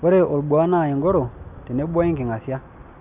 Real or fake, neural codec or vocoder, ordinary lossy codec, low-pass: real; none; none; 3.6 kHz